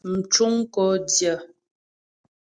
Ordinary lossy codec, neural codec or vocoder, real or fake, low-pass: Opus, 64 kbps; none; real; 9.9 kHz